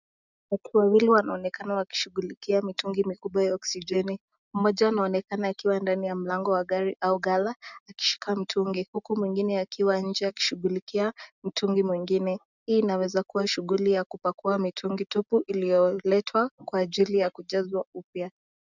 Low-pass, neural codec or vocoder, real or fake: 7.2 kHz; none; real